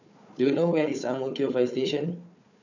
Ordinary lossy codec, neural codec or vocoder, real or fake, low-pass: none; codec, 16 kHz, 4 kbps, FunCodec, trained on Chinese and English, 50 frames a second; fake; 7.2 kHz